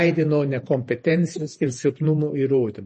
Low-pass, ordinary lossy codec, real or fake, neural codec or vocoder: 10.8 kHz; MP3, 32 kbps; fake; vocoder, 48 kHz, 128 mel bands, Vocos